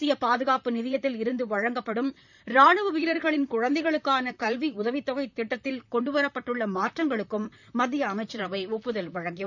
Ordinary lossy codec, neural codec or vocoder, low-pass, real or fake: none; vocoder, 44.1 kHz, 128 mel bands, Pupu-Vocoder; 7.2 kHz; fake